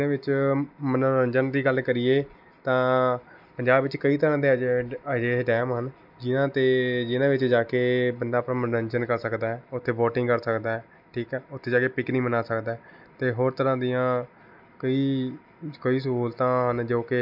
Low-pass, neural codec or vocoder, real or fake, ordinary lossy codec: 5.4 kHz; none; real; none